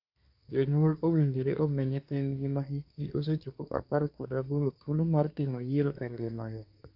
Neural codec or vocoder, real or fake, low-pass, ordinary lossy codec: codec, 24 kHz, 1 kbps, SNAC; fake; 5.4 kHz; AAC, 48 kbps